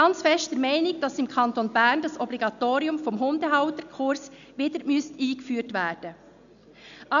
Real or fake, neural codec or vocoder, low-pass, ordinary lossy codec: real; none; 7.2 kHz; none